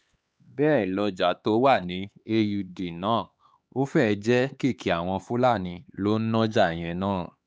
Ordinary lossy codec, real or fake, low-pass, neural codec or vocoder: none; fake; none; codec, 16 kHz, 4 kbps, X-Codec, HuBERT features, trained on LibriSpeech